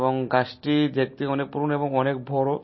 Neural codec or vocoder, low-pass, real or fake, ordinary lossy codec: none; 7.2 kHz; real; MP3, 24 kbps